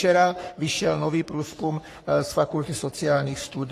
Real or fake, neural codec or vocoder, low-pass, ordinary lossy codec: fake; codec, 44.1 kHz, 3.4 kbps, Pupu-Codec; 14.4 kHz; AAC, 48 kbps